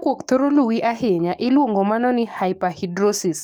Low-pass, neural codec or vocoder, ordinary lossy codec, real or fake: none; codec, 44.1 kHz, 7.8 kbps, DAC; none; fake